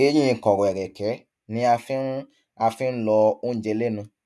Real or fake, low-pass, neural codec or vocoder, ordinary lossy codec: real; none; none; none